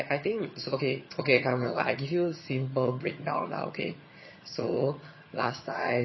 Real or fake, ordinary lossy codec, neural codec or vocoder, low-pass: fake; MP3, 24 kbps; vocoder, 22.05 kHz, 80 mel bands, HiFi-GAN; 7.2 kHz